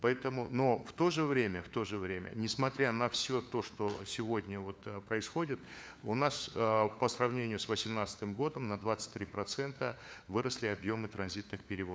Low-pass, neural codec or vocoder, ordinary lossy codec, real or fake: none; codec, 16 kHz, 4 kbps, FunCodec, trained on LibriTTS, 50 frames a second; none; fake